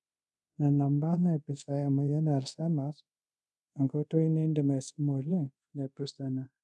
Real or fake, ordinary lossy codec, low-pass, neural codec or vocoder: fake; none; none; codec, 24 kHz, 0.5 kbps, DualCodec